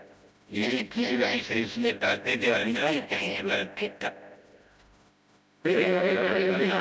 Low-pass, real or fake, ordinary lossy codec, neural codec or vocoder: none; fake; none; codec, 16 kHz, 0.5 kbps, FreqCodec, smaller model